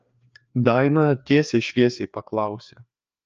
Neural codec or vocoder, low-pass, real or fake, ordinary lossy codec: codec, 16 kHz, 2 kbps, FreqCodec, larger model; 7.2 kHz; fake; Opus, 24 kbps